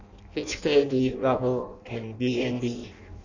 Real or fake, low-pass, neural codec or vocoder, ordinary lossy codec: fake; 7.2 kHz; codec, 16 kHz in and 24 kHz out, 0.6 kbps, FireRedTTS-2 codec; none